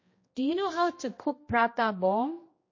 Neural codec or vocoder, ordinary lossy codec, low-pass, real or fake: codec, 16 kHz, 1 kbps, X-Codec, HuBERT features, trained on general audio; MP3, 32 kbps; 7.2 kHz; fake